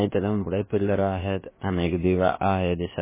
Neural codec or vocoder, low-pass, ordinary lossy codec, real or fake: codec, 16 kHz, about 1 kbps, DyCAST, with the encoder's durations; 3.6 kHz; MP3, 16 kbps; fake